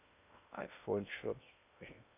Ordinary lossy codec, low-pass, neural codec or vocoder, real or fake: none; 3.6 kHz; codec, 16 kHz in and 24 kHz out, 0.6 kbps, FocalCodec, streaming, 2048 codes; fake